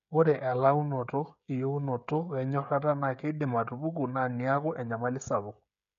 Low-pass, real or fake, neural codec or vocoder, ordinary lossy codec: 7.2 kHz; fake; codec, 16 kHz, 16 kbps, FreqCodec, smaller model; none